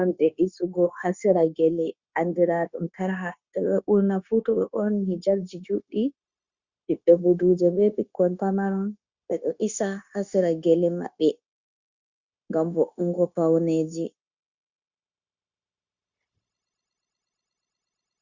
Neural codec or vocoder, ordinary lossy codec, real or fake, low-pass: codec, 16 kHz, 0.9 kbps, LongCat-Audio-Codec; Opus, 64 kbps; fake; 7.2 kHz